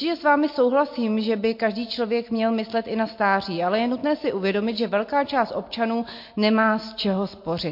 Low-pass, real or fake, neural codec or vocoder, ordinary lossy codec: 5.4 kHz; real; none; MP3, 32 kbps